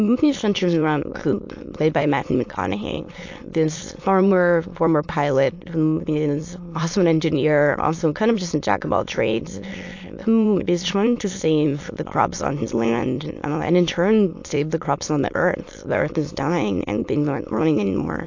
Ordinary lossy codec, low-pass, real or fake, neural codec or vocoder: AAC, 48 kbps; 7.2 kHz; fake; autoencoder, 22.05 kHz, a latent of 192 numbers a frame, VITS, trained on many speakers